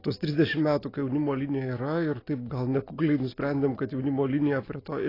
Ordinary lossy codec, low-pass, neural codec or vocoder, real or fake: AAC, 24 kbps; 5.4 kHz; none; real